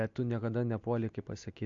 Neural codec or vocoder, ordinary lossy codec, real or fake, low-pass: none; MP3, 48 kbps; real; 7.2 kHz